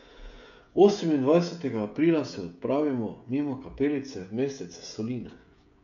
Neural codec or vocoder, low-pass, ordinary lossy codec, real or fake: codec, 16 kHz, 16 kbps, FreqCodec, smaller model; 7.2 kHz; none; fake